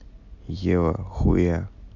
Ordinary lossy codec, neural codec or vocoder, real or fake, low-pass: none; none; real; 7.2 kHz